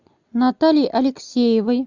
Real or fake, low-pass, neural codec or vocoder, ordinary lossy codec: real; 7.2 kHz; none; Opus, 64 kbps